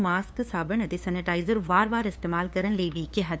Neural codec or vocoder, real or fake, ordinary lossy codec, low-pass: codec, 16 kHz, 2 kbps, FunCodec, trained on LibriTTS, 25 frames a second; fake; none; none